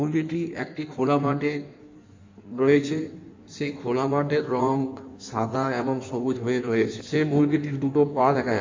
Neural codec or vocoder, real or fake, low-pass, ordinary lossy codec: codec, 16 kHz in and 24 kHz out, 1.1 kbps, FireRedTTS-2 codec; fake; 7.2 kHz; MP3, 64 kbps